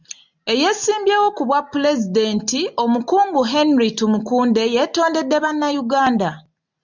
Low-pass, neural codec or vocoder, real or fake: 7.2 kHz; none; real